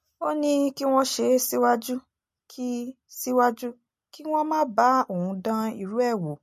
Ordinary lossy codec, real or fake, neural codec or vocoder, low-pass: MP3, 64 kbps; real; none; 14.4 kHz